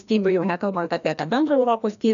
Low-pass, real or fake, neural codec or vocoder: 7.2 kHz; fake; codec, 16 kHz, 1 kbps, FreqCodec, larger model